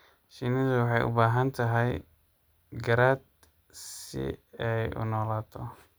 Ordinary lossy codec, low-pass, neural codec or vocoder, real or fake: none; none; none; real